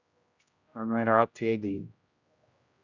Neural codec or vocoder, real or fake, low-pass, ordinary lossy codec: codec, 16 kHz, 0.5 kbps, X-Codec, HuBERT features, trained on general audio; fake; 7.2 kHz; Opus, 64 kbps